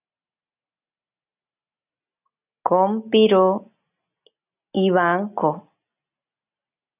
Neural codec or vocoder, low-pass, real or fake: none; 3.6 kHz; real